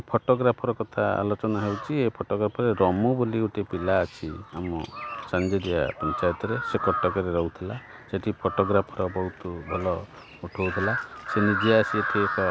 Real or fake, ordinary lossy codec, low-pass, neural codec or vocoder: real; none; none; none